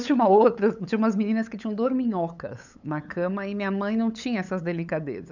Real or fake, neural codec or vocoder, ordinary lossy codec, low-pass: fake; codec, 16 kHz, 8 kbps, FunCodec, trained on LibriTTS, 25 frames a second; none; 7.2 kHz